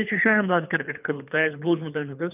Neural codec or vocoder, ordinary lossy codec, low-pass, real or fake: codec, 24 kHz, 3 kbps, HILCodec; none; 3.6 kHz; fake